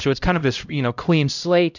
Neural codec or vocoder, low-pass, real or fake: codec, 16 kHz, 0.5 kbps, X-Codec, HuBERT features, trained on LibriSpeech; 7.2 kHz; fake